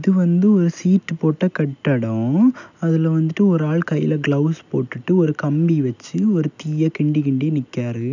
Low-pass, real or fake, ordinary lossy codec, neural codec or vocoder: 7.2 kHz; real; none; none